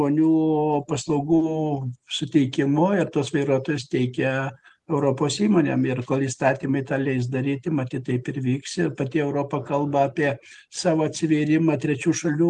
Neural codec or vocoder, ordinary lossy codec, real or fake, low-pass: none; Opus, 24 kbps; real; 10.8 kHz